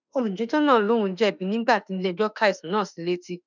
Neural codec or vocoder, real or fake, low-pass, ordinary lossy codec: autoencoder, 48 kHz, 32 numbers a frame, DAC-VAE, trained on Japanese speech; fake; 7.2 kHz; none